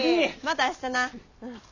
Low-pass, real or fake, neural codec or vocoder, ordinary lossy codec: 7.2 kHz; real; none; none